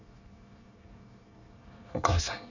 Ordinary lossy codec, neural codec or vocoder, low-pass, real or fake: none; codec, 24 kHz, 1 kbps, SNAC; 7.2 kHz; fake